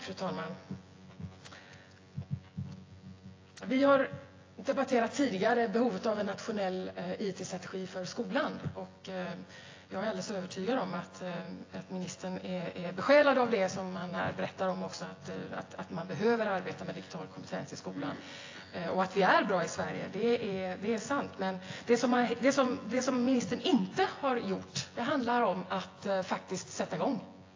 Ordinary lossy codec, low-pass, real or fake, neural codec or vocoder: AAC, 32 kbps; 7.2 kHz; fake; vocoder, 24 kHz, 100 mel bands, Vocos